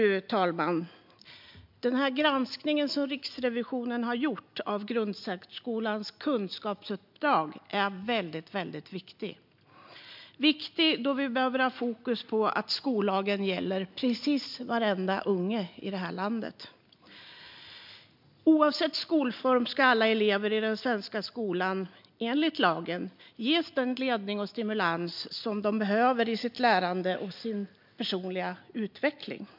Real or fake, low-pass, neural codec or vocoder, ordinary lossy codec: real; 5.4 kHz; none; MP3, 48 kbps